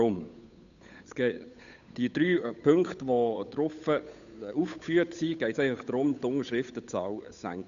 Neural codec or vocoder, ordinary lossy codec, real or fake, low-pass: codec, 16 kHz, 8 kbps, FunCodec, trained on Chinese and English, 25 frames a second; none; fake; 7.2 kHz